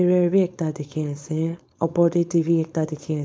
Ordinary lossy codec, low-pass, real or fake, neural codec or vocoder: none; none; fake; codec, 16 kHz, 4.8 kbps, FACodec